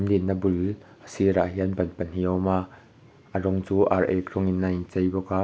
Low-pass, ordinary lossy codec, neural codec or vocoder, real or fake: none; none; none; real